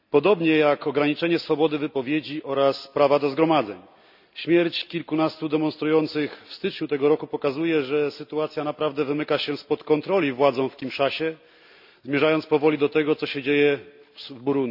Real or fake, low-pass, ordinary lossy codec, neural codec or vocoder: real; 5.4 kHz; none; none